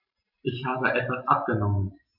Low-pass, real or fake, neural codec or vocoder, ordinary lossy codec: 5.4 kHz; real; none; AAC, 48 kbps